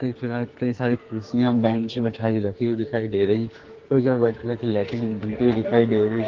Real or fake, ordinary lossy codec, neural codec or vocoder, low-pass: fake; Opus, 16 kbps; codec, 44.1 kHz, 2.6 kbps, SNAC; 7.2 kHz